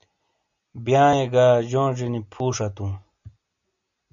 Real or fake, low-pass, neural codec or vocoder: real; 7.2 kHz; none